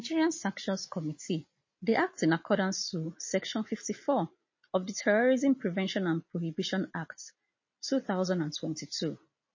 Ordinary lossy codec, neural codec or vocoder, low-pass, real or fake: MP3, 32 kbps; none; 7.2 kHz; real